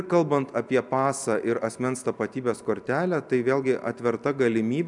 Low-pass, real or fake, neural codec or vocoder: 10.8 kHz; real; none